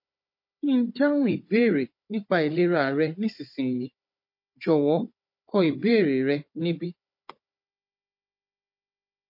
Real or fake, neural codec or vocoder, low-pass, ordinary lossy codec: fake; codec, 16 kHz, 16 kbps, FunCodec, trained on Chinese and English, 50 frames a second; 5.4 kHz; MP3, 32 kbps